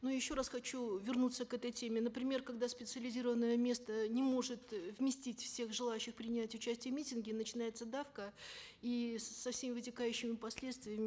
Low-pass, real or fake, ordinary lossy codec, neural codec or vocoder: none; real; none; none